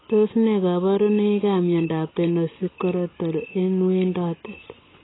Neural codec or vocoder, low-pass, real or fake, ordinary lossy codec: none; 7.2 kHz; real; AAC, 16 kbps